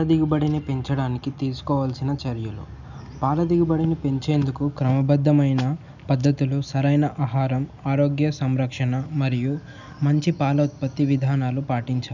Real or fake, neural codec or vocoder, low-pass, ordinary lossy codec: real; none; 7.2 kHz; none